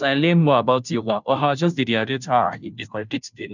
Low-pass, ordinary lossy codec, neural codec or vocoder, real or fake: 7.2 kHz; none; codec, 16 kHz, 0.5 kbps, FunCodec, trained on Chinese and English, 25 frames a second; fake